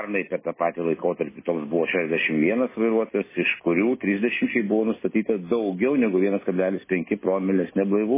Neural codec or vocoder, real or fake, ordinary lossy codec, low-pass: none; real; MP3, 16 kbps; 3.6 kHz